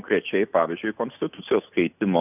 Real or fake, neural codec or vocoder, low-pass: real; none; 3.6 kHz